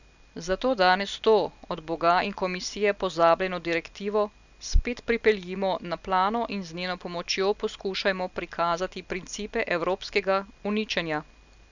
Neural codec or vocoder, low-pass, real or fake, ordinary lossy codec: none; 7.2 kHz; real; none